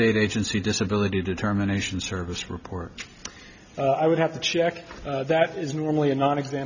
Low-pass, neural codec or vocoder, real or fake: 7.2 kHz; none; real